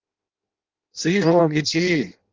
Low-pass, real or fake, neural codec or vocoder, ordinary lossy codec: 7.2 kHz; fake; codec, 16 kHz in and 24 kHz out, 0.6 kbps, FireRedTTS-2 codec; Opus, 24 kbps